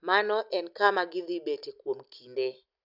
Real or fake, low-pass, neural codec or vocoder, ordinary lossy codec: real; 5.4 kHz; none; none